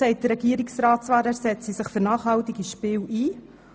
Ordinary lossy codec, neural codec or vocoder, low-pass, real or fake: none; none; none; real